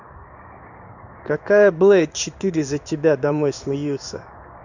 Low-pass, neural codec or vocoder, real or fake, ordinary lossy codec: 7.2 kHz; codec, 16 kHz in and 24 kHz out, 1 kbps, XY-Tokenizer; fake; none